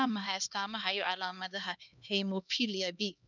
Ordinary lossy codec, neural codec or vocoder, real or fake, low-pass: none; codec, 16 kHz, 2 kbps, X-Codec, HuBERT features, trained on LibriSpeech; fake; 7.2 kHz